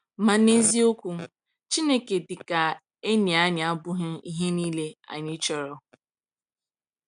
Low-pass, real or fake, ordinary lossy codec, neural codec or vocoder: 9.9 kHz; real; none; none